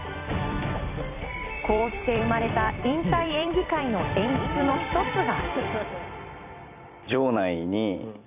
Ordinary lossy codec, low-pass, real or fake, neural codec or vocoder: none; 3.6 kHz; fake; vocoder, 44.1 kHz, 128 mel bands every 256 samples, BigVGAN v2